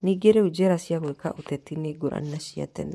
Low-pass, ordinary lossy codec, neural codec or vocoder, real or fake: none; none; vocoder, 24 kHz, 100 mel bands, Vocos; fake